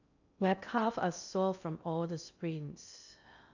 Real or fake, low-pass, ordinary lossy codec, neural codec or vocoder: fake; 7.2 kHz; none; codec, 16 kHz in and 24 kHz out, 0.6 kbps, FocalCodec, streaming, 2048 codes